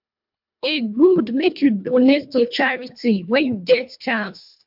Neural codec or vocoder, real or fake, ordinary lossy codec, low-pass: codec, 24 kHz, 1.5 kbps, HILCodec; fake; MP3, 48 kbps; 5.4 kHz